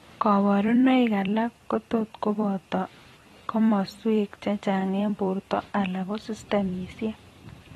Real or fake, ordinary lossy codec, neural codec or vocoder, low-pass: fake; AAC, 32 kbps; vocoder, 44.1 kHz, 128 mel bands every 256 samples, BigVGAN v2; 19.8 kHz